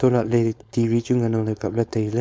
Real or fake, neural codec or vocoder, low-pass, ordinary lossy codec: fake; codec, 16 kHz, 4.8 kbps, FACodec; none; none